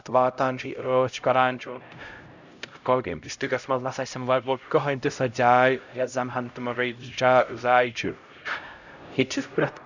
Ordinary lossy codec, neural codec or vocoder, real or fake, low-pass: none; codec, 16 kHz, 0.5 kbps, X-Codec, HuBERT features, trained on LibriSpeech; fake; 7.2 kHz